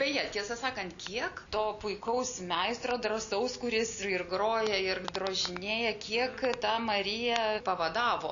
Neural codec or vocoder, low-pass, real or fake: none; 7.2 kHz; real